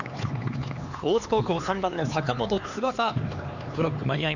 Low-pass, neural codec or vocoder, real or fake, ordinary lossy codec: 7.2 kHz; codec, 16 kHz, 4 kbps, X-Codec, HuBERT features, trained on LibriSpeech; fake; none